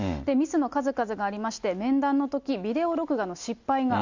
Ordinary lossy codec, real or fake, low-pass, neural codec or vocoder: Opus, 64 kbps; real; 7.2 kHz; none